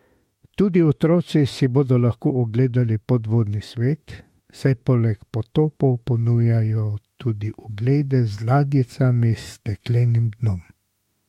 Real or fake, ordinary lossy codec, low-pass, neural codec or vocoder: fake; MP3, 64 kbps; 19.8 kHz; autoencoder, 48 kHz, 32 numbers a frame, DAC-VAE, trained on Japanese speech